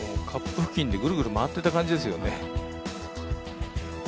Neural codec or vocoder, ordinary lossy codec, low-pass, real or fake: none; none; none; real